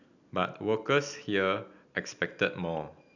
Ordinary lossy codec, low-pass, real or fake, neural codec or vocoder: none; 7.2 kHz; real; none